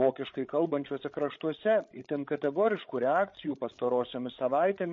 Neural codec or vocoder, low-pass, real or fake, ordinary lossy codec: codec, 16 kHz, 16 kbps, FreqCodec, larger model; 7.2 kHz; fake; MP3, 32 kbps